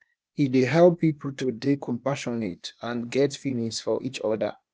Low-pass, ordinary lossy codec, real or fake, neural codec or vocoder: none; none; fake; codec, 16 kHz, 0.8 kbps, ZipCodec